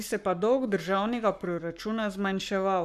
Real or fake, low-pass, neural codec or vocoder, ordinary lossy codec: fake; 14.4 kHz; codec, 44.1 kHz, 7.8 kbps, Pupu-Codec; none